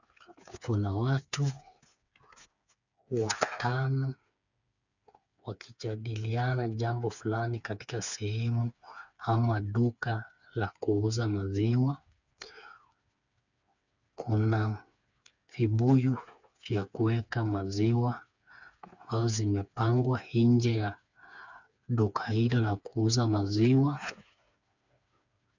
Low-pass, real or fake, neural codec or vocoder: 7.2 kHz; fake; codec, 16 kHz, 4 kbps, FreqCodec, smaller model